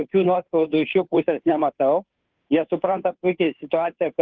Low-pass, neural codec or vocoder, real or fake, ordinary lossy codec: 7.2 kHz; codec, 16 kHz, 2 kbps, FunCodec, trained on Chinese and English, 25 frames a second; fake; Opus, 32 kbps